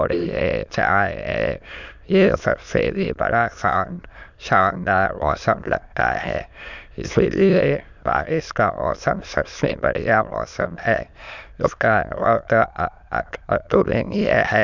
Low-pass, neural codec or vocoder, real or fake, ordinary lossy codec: 7.2 kHz; autoencoder, 22.05 kHz, a latent of 192 numbers a frame, VITS, trained on many speakers; fake; none